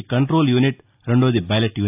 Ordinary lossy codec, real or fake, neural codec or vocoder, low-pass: AAC, 32 kbps; real; none; 3.6 kHz